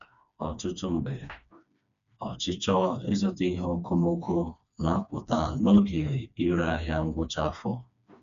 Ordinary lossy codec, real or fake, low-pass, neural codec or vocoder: MP3, 96 kbps; fake; 7.2 kHz; codec, 16 kHz, 2 kbps, FreqCodec, smaller model